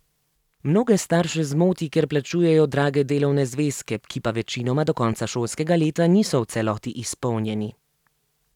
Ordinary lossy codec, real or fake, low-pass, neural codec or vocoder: none; fake; 19.8 kHz; vocoder, 44.1 kHz, 128 mel bands every 256 samples, BigVGAN v2